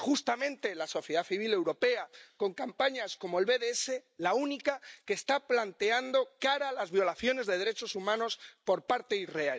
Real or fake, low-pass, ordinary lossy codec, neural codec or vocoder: real; none; none; none